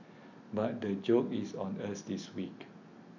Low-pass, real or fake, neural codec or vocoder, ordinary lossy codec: 7.2 kHz; real; none; none